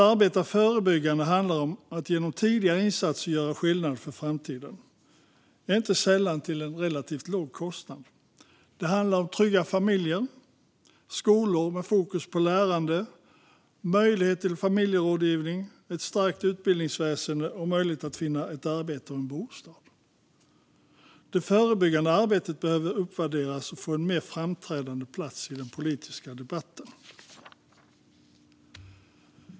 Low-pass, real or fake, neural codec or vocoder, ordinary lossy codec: none; real; none; none